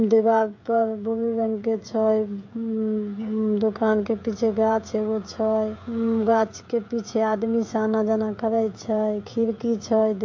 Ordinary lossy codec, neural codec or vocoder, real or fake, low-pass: MP3, 48 kbps; codec, 16 kHz, 16 kbps, FreqCodec, smaller model; fake; 7.2 kHz